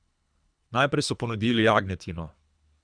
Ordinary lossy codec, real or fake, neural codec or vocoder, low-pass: none; fake; codec, 24 kHz, 3 kbps, HILCodec; 9.9 kHz